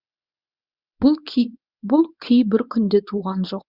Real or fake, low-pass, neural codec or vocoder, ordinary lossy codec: fake; 5.4 kHz; codec, 24 kHz, 0.9 kbps, WavTokenizer, medium speech release version 1; Opus, 64 kbps